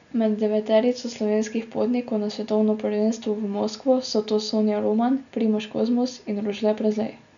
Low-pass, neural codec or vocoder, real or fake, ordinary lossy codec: 7.2 kHz; none; real; none